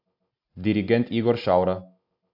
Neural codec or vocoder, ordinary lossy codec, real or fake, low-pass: none; AAC, 48 kbps; real; 5.4 kHz